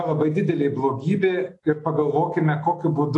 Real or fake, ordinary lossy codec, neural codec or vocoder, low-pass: real; AAC, 48 kbps; none; 10.8 kHz